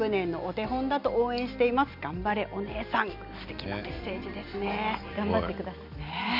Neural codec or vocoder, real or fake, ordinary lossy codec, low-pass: none; real; none; 5.4 kHz